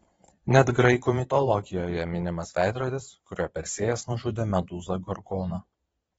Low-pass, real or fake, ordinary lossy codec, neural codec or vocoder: 9.9 kHz; fake; AAC, 24 kbps; vocoder, 22.05 kHz, 80 mel bands, Vocos